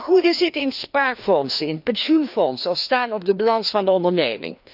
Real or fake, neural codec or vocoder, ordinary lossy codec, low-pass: fake; codec, 16 kHz, 2 kbps, FreqCodec, larger model; none; 5.4 kHz